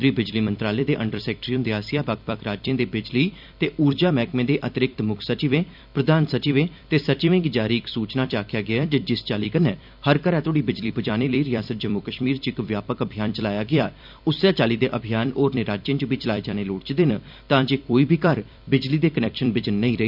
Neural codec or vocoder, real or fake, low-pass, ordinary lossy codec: vocoder, 44.1 kHz, 128 mel bands every 256 samples, BigVGAN v2; fake; 5.4 kHz; none